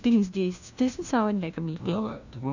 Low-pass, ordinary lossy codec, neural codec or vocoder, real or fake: 7.2 kHz; none; codec, 16 kHz, 1 kbps, FunCodec, trained on LibriTTS, 50 frames a second; fake